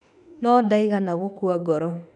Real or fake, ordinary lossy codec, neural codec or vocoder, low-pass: fake; none; autoencoder, 48 kHz, 32 numbers a frame, DAC-VAE, trained on Japanese speech; 10.8 kHz